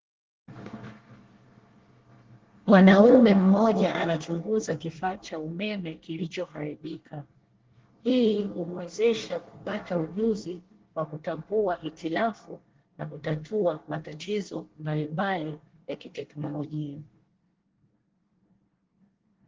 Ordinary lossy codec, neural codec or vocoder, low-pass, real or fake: Opus, 16 kbps; codec, 24 kHz, 1 kbps, SNAC; 7.2 kHz; fake